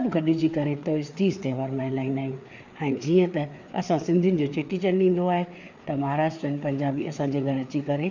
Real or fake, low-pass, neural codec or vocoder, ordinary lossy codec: fake; 7.2 kHz; codec, 16 kHz, 4 kbps, FreqCodec, larger model; none